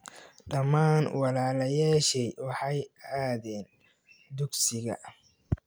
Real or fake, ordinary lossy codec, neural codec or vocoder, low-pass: real; none; none; none